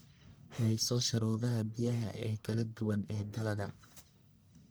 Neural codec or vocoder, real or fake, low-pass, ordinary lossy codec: codec, 44.1 kHz, 1.7 kbps, Pupu-Codec; fake; none; none